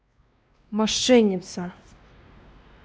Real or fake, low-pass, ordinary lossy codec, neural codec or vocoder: fake; none; none; codec, 16 kHz, 2 kbps, X-Codec, WavLM features, trained on Multilingual LibriSpeech